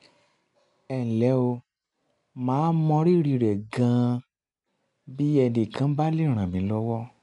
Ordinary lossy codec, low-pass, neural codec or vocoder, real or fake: none; 10.8 kHz; none; real